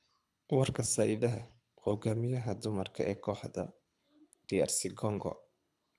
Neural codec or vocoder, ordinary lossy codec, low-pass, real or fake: codec, 24 kHz, 6 kbps, HILCodec; none; none; fake